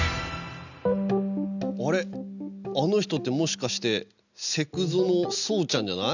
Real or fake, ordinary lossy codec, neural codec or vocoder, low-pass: real; none; none; 7.2 kHz